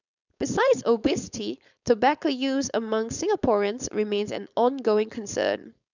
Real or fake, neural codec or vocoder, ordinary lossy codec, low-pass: fake; codec, 16 kHz, 4.8 kbps, FACodec; none; 7.2 kHz